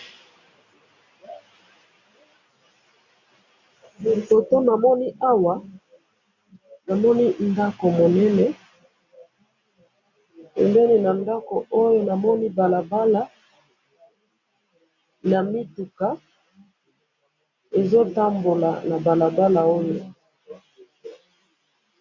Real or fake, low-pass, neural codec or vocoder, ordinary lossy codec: real; 7.2 kHz; none; MP3, 48 kbps